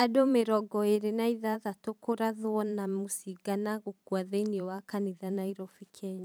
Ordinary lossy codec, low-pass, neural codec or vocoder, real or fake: none; none; vocoder, 44.1 kHz, 128 mel bands every 512 samples, BigVGAN v2; fake